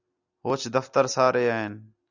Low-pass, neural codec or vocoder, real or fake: 7.2 kHz; none; real